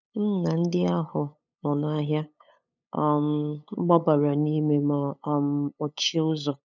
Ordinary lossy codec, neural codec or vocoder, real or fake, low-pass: none; codec, 16 kHz, 8 kbps, FunCodec, trained on LibriTTS, 25 frames a second; fake; 7.2 kHz